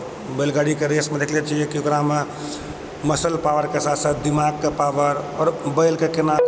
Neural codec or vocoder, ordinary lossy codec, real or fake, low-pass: none; none; real; none